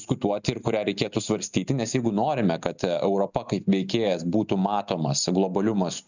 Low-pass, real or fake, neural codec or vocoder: 7.2 kHz; real; none